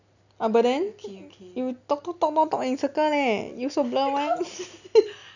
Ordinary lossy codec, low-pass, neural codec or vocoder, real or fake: none; 7.2 kHz; none; real